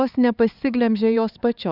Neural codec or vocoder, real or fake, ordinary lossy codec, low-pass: codec, 16 kHz, 4 kbps, X-Codec, HuBERT features, trained on LibriSpeech; fake; Opus, 64 kbps; 5.4 kHz